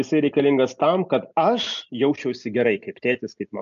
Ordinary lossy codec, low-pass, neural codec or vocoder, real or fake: AAC, 64 kbps; 7.2 kHz; none; real